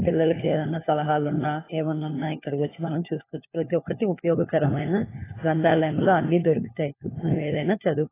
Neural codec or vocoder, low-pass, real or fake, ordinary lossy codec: codec, 16 kHz, 4 kbps, FunCodec, trained on LibriTTS, 50 frames a second; 3.6 kHz; fake; AAC, 16 kbps